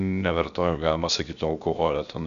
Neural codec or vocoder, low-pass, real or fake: codec, 16 kHz, about 1 kbps, DyCAST, with the encoder's durations; 7.2 kHz; fake